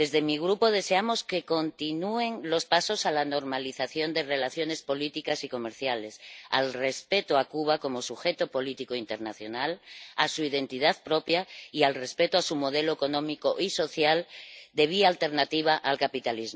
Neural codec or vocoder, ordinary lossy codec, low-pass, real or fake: none; none; none; real